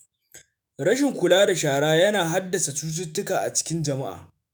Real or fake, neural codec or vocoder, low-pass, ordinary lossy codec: fake; autoencoder, 48 kHz, 128 numbers a frame, DAC-VAE, trained on Japanese speech; none; none